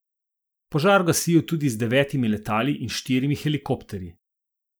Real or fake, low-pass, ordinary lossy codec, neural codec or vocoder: real; none; none; none